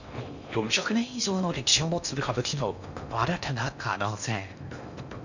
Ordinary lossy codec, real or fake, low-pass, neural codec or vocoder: none; fake; 7.2 kHz; codec, 16 kHz in and 24 kHz out, 0.6 kbps, FocalCodec, streaming, 4096 codes